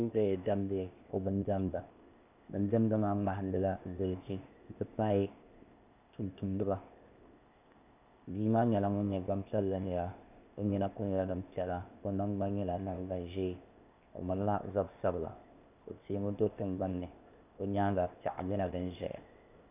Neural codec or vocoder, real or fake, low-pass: codec, 16 kHz, 0.8 kbps, ZipCodec; fake; 3.6 kHz